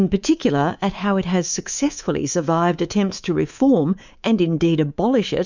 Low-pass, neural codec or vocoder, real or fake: 7.2 kHz; codec, 24 kHz, 3.1 kbps, DualCodec; fake